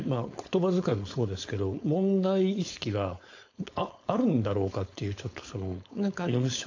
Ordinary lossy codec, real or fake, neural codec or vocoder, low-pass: AAC, 48 kbps; fake; codec, 16 kHz, 4.8 kbps, FACodec; 7.2 kHz